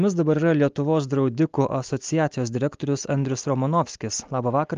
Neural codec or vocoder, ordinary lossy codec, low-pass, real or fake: none; Opus, 32 kbps; 7.2 kHz; real